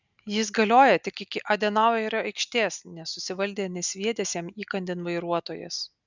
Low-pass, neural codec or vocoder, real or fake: 7.2 kHz; none; real